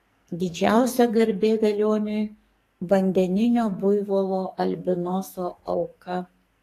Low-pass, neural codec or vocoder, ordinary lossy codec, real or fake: 14.4 kHz; codec, 44.1 kHz, 2.6 kbps, SNAC; AAC, 48 kbps; fake